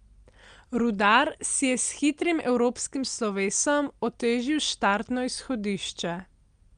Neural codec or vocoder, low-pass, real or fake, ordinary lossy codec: none; 9.9 kHz; real; Opus, 32 kbps